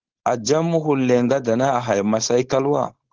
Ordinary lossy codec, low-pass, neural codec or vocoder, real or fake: Opus, 16 kbps; 7.2 kHz; codec, 16 kHz, 4.8 kbps, FACodec; fake